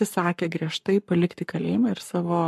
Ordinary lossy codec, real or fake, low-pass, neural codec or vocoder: MP3, 64 kbps; fake; 14.4 kHz; codec, 44.1 kHz, 7.8 kbps, Pupu-Codec